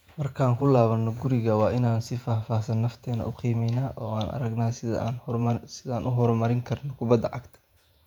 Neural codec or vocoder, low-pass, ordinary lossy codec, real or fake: vocoder, 48 kHz, 128 mel bands, Vocos; 19.8 kHz; none; fake